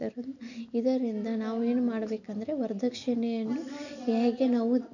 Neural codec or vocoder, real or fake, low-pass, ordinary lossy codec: none; real; 7.2 kHz; none